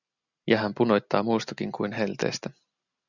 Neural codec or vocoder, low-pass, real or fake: none; 7.2 kHz; real